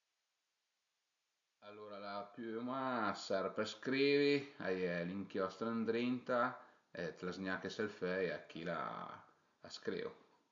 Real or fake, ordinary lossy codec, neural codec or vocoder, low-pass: real; AAC, 64 kbps; none; 7.2 kHz